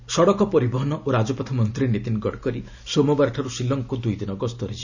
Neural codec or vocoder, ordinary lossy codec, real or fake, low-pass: none; none; real; 7.2 kHz